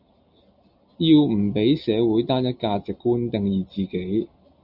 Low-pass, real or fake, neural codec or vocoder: 5.4 kHz; real; none